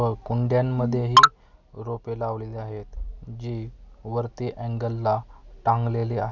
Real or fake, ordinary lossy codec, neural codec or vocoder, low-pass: real; none; none; 7.2 kHz